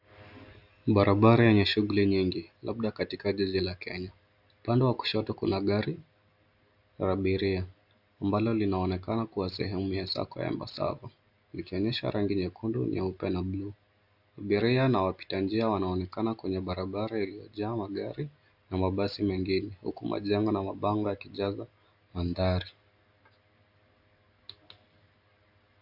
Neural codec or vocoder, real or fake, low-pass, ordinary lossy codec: none; real; 5.4 kHz; AAC, 48 kbps